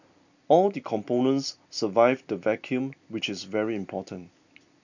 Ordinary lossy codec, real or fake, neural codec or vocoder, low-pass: none; real; none; 7.2 kHz